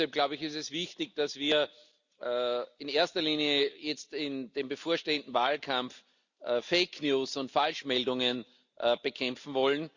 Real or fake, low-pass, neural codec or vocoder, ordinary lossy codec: real; 7.2 kHz; none; Opus, 64 kbps